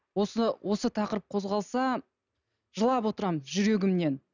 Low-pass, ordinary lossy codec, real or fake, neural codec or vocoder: 7.2 kHz; none; real; none